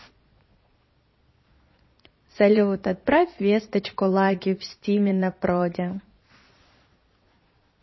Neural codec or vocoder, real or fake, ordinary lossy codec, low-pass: vocoder, 22.05 kHz, 80 mel bands, Vocos; fake; MP3, 24 kbps; 7.2 kHz